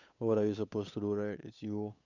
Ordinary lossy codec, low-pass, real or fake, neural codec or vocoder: none; 7.2 kHz; fake; codec, 16 kHz, 8 kbps, FunCodec, trained on Chinese and English, 25 frames a second